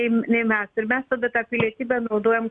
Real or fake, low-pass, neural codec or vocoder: real; 9.9 kHz; none